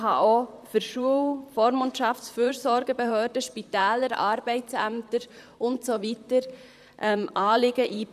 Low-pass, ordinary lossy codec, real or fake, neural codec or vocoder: 14.4 kHz; none; fake; vocoder, 44.1 kHz, 128 mel bands, Pupu-Vocoder